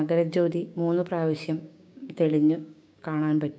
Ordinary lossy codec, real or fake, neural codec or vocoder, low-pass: none; fake; codec, 16 kHz, 6 kbps, DAC; none